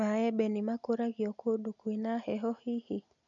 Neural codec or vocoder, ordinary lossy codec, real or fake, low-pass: none; none; real; 7.2 kHz